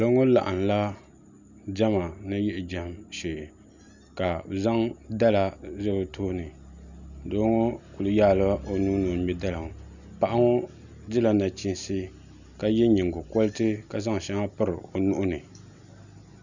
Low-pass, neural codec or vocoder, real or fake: 7.2 kHz; none; real